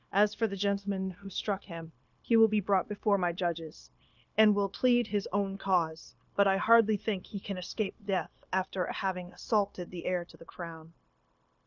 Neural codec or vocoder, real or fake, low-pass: codec, 16 kHz, 0.9 kbps, LongCat-Audio-Codec; fake; 7.2 kHz